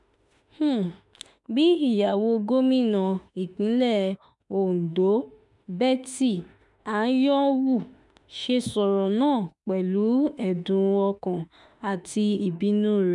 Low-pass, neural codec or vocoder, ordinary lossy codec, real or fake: 10.8 kHz; autoencoder, 48 kHz, 32 numbers a frame, DAC-VAE, trained on Japanese speech; none; fake